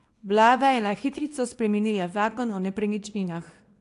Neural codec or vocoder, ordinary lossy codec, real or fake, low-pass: codec, 24 kHz, 0.9 kbps, WavTokenizer, small release; AAC, 48 kbps; fake; 10.8 kHz